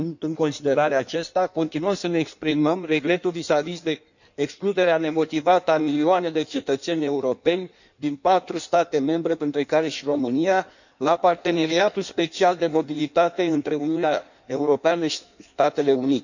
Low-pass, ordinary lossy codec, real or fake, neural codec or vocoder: 7.2 kHz; none; fake; codec, 16 kHz in and 24 kHz out, 1.1 kbps, FireRedTTS-2 codec